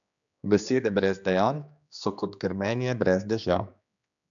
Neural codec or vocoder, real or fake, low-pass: codec, 16 kHz, 2 kbps, X-Codec, HuBERT features, trained on general audio; fake; 7.2 kHz